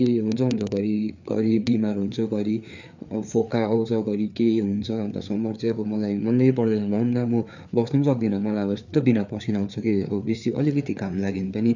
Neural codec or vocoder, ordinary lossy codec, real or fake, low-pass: codec, 16 kHz, 4 kbps, FreqCodec, larger model; none; fake; 7.2 kHz